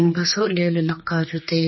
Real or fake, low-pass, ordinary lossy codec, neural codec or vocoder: fake; 7.2 kHz; MP3, 24 kbps; codec, 16 kHz, 2 kbps, X-Codec, HuBERT features, trained on general audio